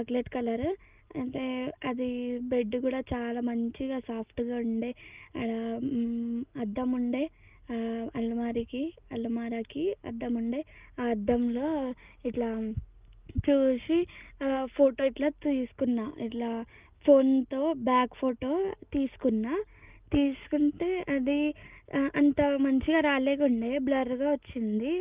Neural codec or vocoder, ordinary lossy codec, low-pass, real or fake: none; Opus, 32 kbps; 3.6 kHz; real